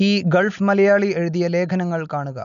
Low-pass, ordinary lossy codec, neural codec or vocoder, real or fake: 7.2 kHz; none; none; real